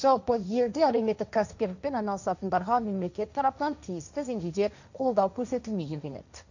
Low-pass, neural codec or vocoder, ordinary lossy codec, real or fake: 7.2 kHz; codec, 16 kHz, 1.1 kbps, Voila-Tokenizer; none; fake